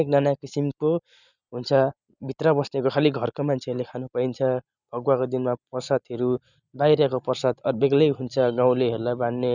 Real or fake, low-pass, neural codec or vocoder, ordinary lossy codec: real; 7.2 kHz; none; none